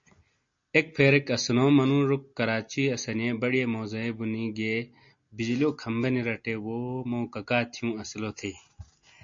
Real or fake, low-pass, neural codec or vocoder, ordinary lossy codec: real; 7.2 kHz; none; MP3, 48 kbps